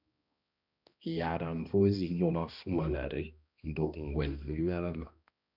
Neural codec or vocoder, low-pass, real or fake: codec, 16 kHz, 1 kbps, X-Codec, HuBERT features, trained on balanced general audio; 5.4 kHz; fake